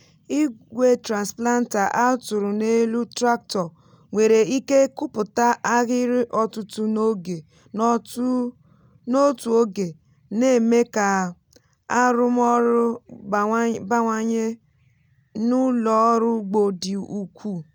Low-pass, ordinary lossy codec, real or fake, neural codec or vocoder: 19.8 kHz; none; real; none